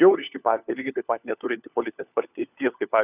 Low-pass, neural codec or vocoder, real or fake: 3.6 kHz; codec, 16 kHz, 4 kbps, FunCodec, trained on LibriTTS, 50 frames a second; fake